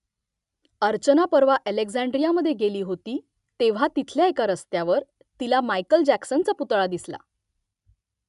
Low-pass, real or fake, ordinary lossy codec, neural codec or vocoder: 10.8 kHz; real; none; none